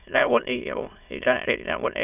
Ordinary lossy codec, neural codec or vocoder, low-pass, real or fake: none; autoencoder, 22.05 kHz, a latent of 192 numbers a frame, VITS, trained on many speakers; 3.6 kHz; fake